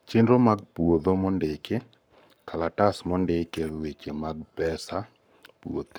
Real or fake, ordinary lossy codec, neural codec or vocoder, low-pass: fake; none; codec, 44.1 kHz, 7.8 kbps, Pupu-Codec; none